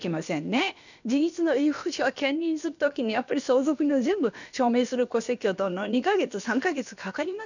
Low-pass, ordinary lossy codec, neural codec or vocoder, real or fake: 7.2 kHz; none; codec, 16 kHz, about 1 kbps, DyCAST, with the encoder's durations; fake